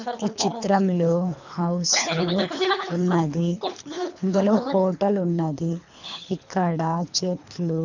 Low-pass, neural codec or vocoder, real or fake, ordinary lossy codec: 7.2 kHz; codec, 24 kHz, 3 kbps, HILCodec; fake; none